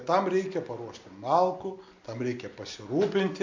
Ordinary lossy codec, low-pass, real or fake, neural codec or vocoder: MP3, 64 kbps; 7.2 kHz; real; none